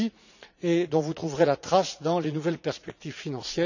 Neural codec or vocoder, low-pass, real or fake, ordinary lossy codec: vocoder, 44.1 kHz, 80 mel bands, Vocos; 7.2 kHz; fake; none